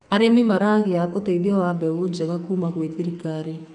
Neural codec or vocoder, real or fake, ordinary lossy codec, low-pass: codec, 44.1 kHz, 2.6 kbps, SNAC; fake; none; 10.8 kHz